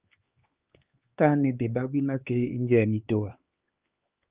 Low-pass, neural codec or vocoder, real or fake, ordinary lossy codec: 3.6 kHz; codec, 16 kHz, 4 kbps, X-Codec, HuBERT features, trained on LibriSpeech; fake; Opus, 24 kbps